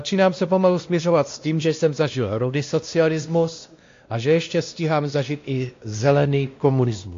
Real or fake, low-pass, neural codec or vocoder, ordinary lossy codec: fake; 7.2 kHz; codec, 16 kHz, 1 kbps, X-Codec, WavLM features, trained on Multilingual LibriSpeech; AAC, 48 kbps